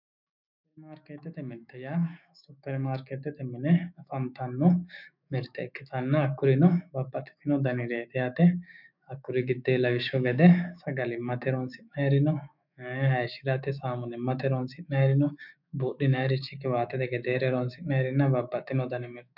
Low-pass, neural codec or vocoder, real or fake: 5.4 kHz; autoencoder, 48 kHz, 128 numbers a frame, DAC-VAE, trained on Japanese speech; fake